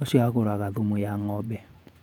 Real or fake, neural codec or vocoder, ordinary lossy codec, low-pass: fake; vocoder, 48 kHz, 128 mel bands, Vocos; none; 19.8 kHz